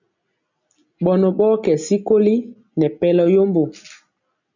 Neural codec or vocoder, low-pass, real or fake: none; 7.2 kHz; real